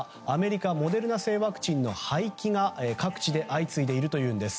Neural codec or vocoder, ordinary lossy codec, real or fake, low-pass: none; none; real; none